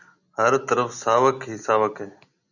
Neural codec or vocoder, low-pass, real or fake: none; 7.2 kHz; real